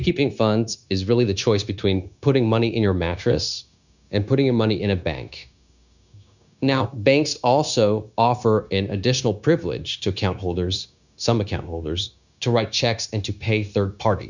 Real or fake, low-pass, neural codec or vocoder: fake; 7.2 kHz; codec, 16 kHz, 0.9 kbps, LongCat-Audio-Codec